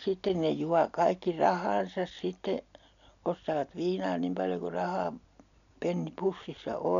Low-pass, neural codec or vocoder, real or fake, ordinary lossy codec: 7.2 kHz; none; real; none